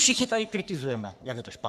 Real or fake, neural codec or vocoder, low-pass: fake; codec, 44.1 kHz, 3.4 kbps, Pupu-Codec; 14.4 kHz